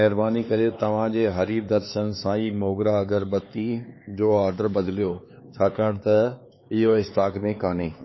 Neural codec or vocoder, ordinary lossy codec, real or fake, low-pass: codec, 16 kHz, 4 kbps, X-Codec, HuBERT features, trained on LibriSpeech; MP3, 24 kbps; fake; 7.2 kHz